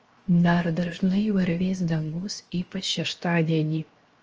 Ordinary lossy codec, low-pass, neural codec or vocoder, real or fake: Opus, 24 kbps; 7.2 kHz; codec, 16 kHz, 0.7 kbps, FocalCodec; fake